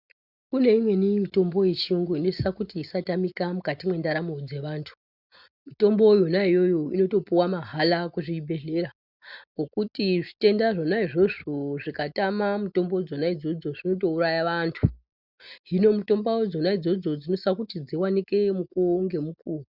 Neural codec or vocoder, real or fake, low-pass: none; real; 5.4 kHz